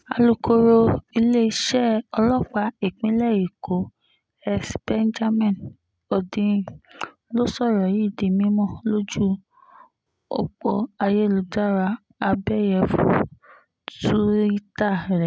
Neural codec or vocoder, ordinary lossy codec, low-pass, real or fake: none; none; none; real